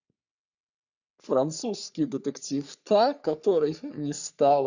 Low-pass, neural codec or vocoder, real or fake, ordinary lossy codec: 7.2 kHz; codec, 44.1 kHz, 3.4 kbps, Pupu-Codec; fake; none